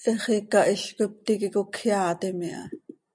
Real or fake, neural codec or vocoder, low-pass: real; none; 9.9 kHz